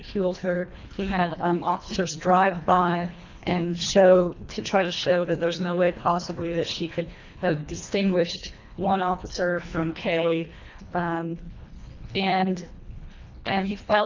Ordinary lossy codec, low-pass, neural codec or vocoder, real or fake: MP3, 64 kbps; 7.2 kHz; codec, 24 kHz, 1.5 kbps, HILCodec; fake